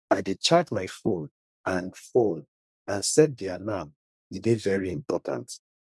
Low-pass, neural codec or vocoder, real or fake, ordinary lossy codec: none; codec, 24 kHz, 1 kbps, SNAC; fake; none